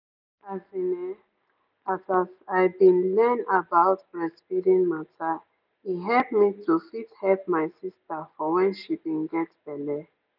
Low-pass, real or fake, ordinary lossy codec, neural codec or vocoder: 5.4 kHz; real; none; none